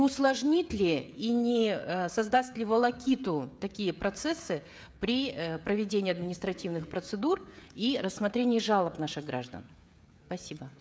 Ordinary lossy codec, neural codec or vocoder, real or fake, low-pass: none; codec, 16 kHz, 16 kbps, FreqCodec, smaller model; fake; none